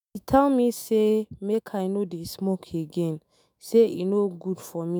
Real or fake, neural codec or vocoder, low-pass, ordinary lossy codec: fake; autoencoder, 48 kHz, 128 numbers a frame, DAC-VAE, trained on Japanese speech; none; none